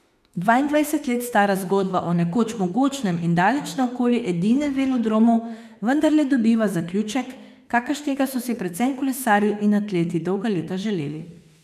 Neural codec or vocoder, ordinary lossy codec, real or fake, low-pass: autoencoder, 48 kHz, 32 numbers a frame, DAC-VAE, trained on Japanese speech; none; fake; 14.4 kHz